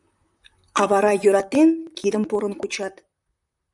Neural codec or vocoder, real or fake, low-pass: vocoder, 44.1 kHz, 128 mel bands, Pupu-Vocoder; fake; 10.8 kHz